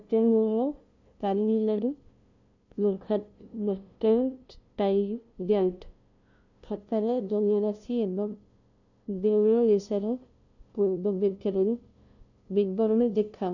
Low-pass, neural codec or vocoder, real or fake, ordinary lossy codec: 7.2 kHz; codec, 16 kHz, 0.5 kbps, FunCodec, trained on LibriTTS, 25 frames a second; fake; none